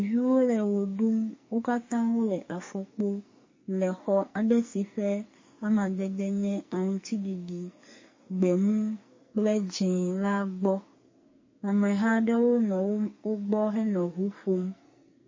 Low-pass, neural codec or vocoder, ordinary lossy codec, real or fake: 7.2 kHz; codec, 44.1 kHz, 2.6 kbps, SNAC; MP3, 32 kbps; fake